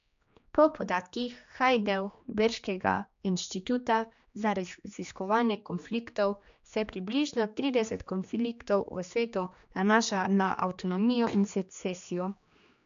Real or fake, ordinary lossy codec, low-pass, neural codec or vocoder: fake; MP3, 64 kbps; 7.2 kHz; codec, 16 kHz, 2 kbps, X-Codec, HuBERT features, trained on general audio